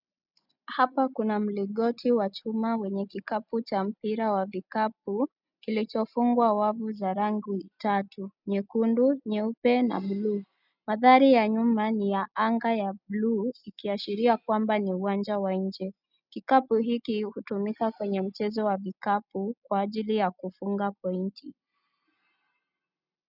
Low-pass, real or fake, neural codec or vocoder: 5.4 kHz; real; none